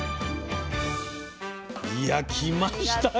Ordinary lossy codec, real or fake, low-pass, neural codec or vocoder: none; real; none; none